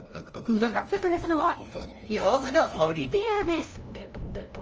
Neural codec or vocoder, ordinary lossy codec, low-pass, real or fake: codec, 16 kHz, 0.5 kbps, FunCodec, trained on LibriTTS, 25 frames a second; Opus, 24 kbps; 7.2 kHz; fake